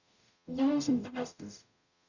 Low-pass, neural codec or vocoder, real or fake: 7.2 kHz; codec, 44.1 kHz, 0.9 kbps, DAC; fake